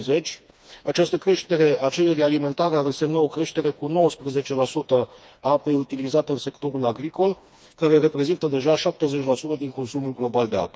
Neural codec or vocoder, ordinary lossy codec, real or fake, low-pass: codec, 16 kHz, 2 kbps, FreqCodec, smaller model; none; fake; none